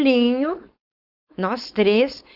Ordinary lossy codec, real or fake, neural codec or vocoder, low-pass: Opus, 64 kbps; fake; codec, 16 kHz, 4.8 kbps, FACodec; 5.4 kHz